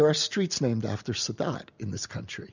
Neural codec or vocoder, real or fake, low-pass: none; real; 7.2 kHz